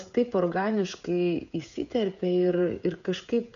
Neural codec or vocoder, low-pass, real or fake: codec, 16 kHz, 16 kbps, FreqCodec, smaller model; 7.2 kHz; fake